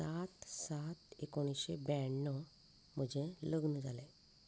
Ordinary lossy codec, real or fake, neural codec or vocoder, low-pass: none; real; none; none